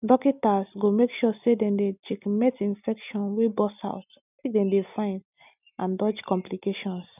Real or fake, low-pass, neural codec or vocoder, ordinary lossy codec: real; 3.6 kHz; none; none